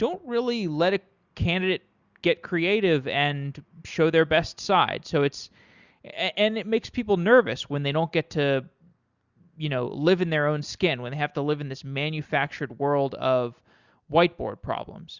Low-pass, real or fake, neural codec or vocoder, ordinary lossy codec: 7.2 kHz; real; none; Opus, 64 kbps